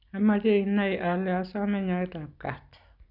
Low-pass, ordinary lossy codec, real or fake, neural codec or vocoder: 5.4 kHz; none; real; none